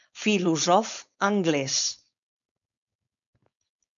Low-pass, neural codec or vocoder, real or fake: 7.2 kHz; codec, 16 kHz, 4.8 kbps, FACodec; fake